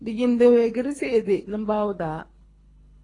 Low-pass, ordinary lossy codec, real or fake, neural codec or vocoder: 10.8 kHz; AAC, 32 kbps; fake; codec, 24 kHz, 3 kbps, HILCodec